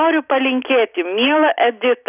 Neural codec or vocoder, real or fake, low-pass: none; real; 3.6 kHz